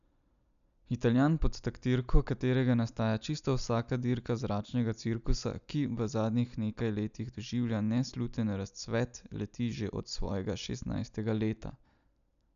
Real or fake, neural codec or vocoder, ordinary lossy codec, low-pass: real; none; none; 7.2 kHz